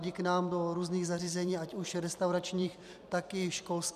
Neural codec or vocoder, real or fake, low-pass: vocoder, 44.1 kHz, 128 mel bands every 256 samples, BigVGAN v2; fake; 14.4 kHz